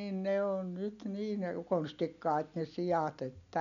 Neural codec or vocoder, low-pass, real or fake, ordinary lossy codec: none; 7.2 kHz; real; none